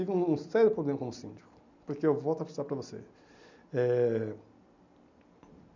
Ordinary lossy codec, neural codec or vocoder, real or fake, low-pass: none; none; real; 7.2 kHz